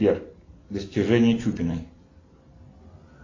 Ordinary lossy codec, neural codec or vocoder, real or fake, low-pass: AAC, 32 kbps; none; real; 7.2 kHz